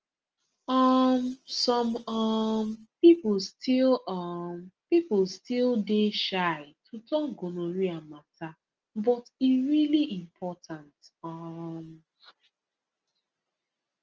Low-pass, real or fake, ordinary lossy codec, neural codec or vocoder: 7.2 kHz; real; Opus, 24 kbps; none